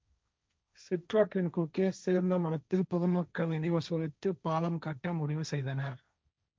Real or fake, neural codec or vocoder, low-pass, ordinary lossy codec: fake; codec, 16 kHz, 1.1 kbps, Voila-Tokenizer; none; none